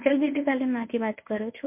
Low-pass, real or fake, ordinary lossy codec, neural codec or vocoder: 3.6 kHz; fake; MP3, 32 kbps; codec, 24 kHz, 0.9 kbps, WavTokenizer, medium speech release version 1